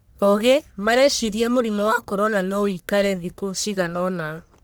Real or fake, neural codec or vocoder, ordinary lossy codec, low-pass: fake; codec, 44.1 kHz, 1.7 kbps, Pupu-Codec; none; none